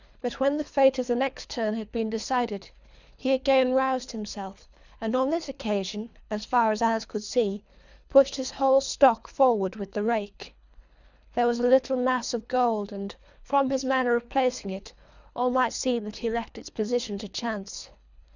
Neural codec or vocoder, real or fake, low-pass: codec, 24 kHz, 3 kbps, HILCodec; fake; 7.2 kHz